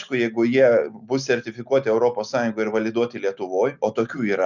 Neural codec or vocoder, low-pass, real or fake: none; 7.2 kHz; real